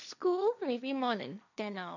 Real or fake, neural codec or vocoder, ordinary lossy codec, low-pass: fake; codec, 16 kHz, 1.1 kbps, Voila-Tokenizer; none; 7.2 kHz